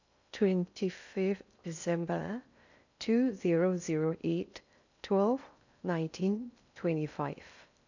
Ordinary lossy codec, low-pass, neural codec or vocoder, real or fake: none; 7.2 kHz; codec, 16 kHz in and 24 kHz out, 0.6 kbps, FocalCodec, streaming, 2048 codes; fake